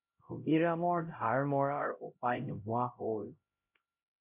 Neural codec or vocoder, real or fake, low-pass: codec, 16 kHz, 0.5 kbps, X-Codec, HuBERT features, trained on LibriSpeech; fake; 3.6 kHz